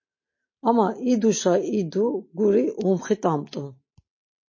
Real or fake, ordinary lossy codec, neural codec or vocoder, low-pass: real; MP3, 32 kbps; none; 7.2 kHz